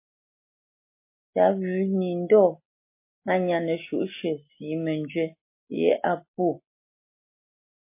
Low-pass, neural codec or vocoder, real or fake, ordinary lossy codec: 3.6 kHz; none; real; MP3, 24 kbps